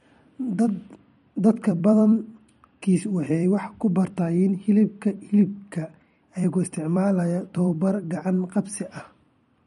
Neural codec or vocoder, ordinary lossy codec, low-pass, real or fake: vocoder, 44.1 kHz, 128 mel bands every 256 samples, BigVGAN v2; MP3, 48 kbps; 19.8 kHz; fake